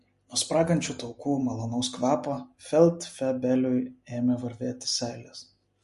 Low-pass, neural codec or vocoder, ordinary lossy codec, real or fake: 14.4 kHz; none; MP3, 48 kbps; real